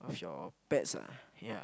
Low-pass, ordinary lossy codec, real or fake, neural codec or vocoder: none; none; real; none